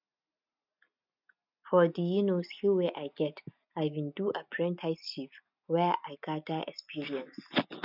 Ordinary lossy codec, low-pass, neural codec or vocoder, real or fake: none; 5.4 kHz; none; real